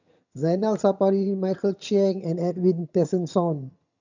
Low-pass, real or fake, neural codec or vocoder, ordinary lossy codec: 7.2 kHz; fake; vocoder, 22.05 kHz, 80 mel bands, HiFi-GAN; none